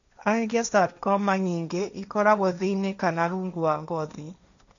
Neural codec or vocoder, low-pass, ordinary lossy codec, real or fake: codec, 16 kHz, 1.1 kbps, Voila-Tokenizer; 7.2 kHz; none; fake